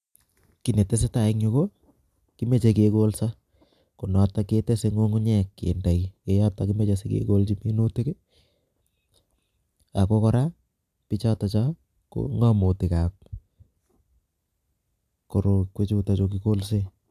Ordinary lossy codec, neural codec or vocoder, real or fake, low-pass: AAC, 96 kbps; none; real; 14.4 kHz